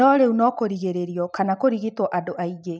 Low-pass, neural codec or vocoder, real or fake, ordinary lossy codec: none; none; real; none